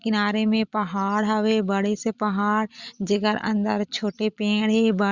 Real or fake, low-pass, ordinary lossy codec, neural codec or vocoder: real; 7.2 kHz; Opus, 64 kbps; none